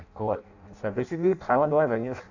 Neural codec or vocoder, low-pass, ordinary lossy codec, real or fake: codec, 16 kHz in and 24 kHz out, 0.6 kbps, FireRedTTS-2 codec; 7.2 kHz; none; fake